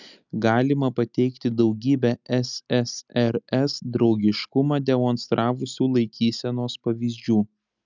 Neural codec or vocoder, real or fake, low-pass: none; real; 7.2 kHz